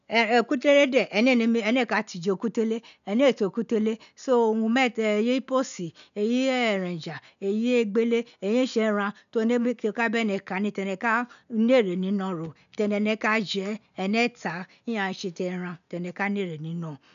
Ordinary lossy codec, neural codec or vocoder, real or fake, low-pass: none; none; real; 7.2 kHz